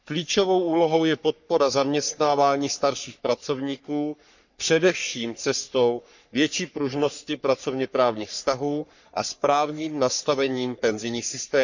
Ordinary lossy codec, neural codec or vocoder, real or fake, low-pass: none; codec, 44.1 kHz, 3.4 kbps, Pupu-Codec; fake; 7.2 kHz